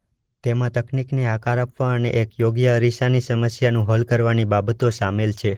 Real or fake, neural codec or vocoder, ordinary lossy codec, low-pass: real; none; Opus, 16 kbps; 19.8 kHz